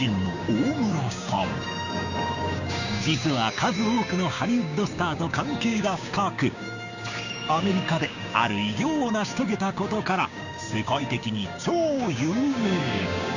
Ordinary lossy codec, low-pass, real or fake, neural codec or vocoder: none; 7.2 kHz; fake; codec, 16 kHz, 6 kbps, DAC